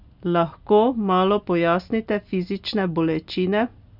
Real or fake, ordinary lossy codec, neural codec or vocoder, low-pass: real; none; none; 5.4 kHz